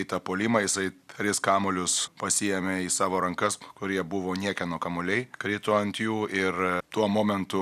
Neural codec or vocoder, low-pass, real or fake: none; 14.4 kHz; real